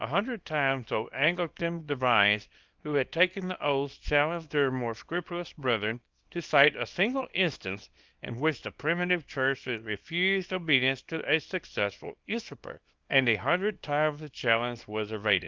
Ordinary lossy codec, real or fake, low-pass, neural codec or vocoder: Opus, 32 kbps; fake; 7.2 kHz; codec, 24 kHz, 0.9 kbps, WavTokenizer, small release